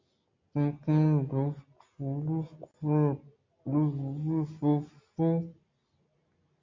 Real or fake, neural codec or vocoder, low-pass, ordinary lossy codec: real; none; 7.2 kHz; MP3, 48 kbps